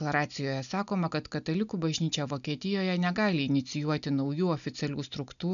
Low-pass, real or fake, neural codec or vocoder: 7.2 kHz; real; none